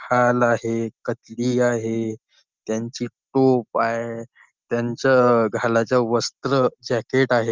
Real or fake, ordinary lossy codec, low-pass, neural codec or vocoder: real; Opus, 24 kbps; 7.2 kHz; none